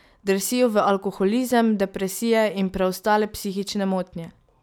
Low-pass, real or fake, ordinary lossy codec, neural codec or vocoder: none; real; none; none